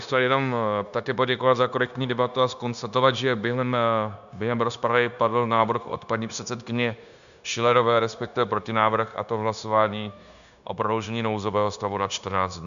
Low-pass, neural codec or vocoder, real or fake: 7.2 kHz; codec, 16 kHz, 0.9 kbps, LongCat-Audio-Codec; fake